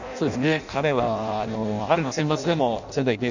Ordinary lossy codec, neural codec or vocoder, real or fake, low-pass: none; codec, 16 kHz in and 24 kHz out, 0.6 kbps, FireRedTTS-2 codec; fake; 7.2 kHz